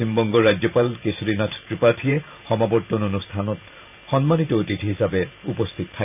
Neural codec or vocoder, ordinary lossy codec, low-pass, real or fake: vocoder, 44.1 kHz, 128 mel bands every 512 samples, BigVGAN v2; none; 3.6 kHz; fake